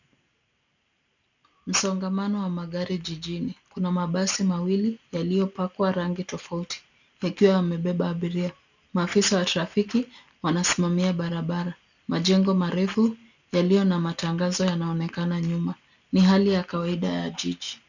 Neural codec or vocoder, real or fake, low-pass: none; real; 7.2 kHz